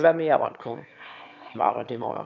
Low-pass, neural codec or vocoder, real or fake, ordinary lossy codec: 7.2 kHz; autoencoder, 22.05 kHz, a latent of 192 numbers a frame, VITS, trained on one speaker; fake; none